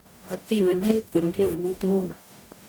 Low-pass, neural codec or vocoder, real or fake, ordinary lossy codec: none; codec, 44.1 kHz, 0.9 kbps, DAC; fake; none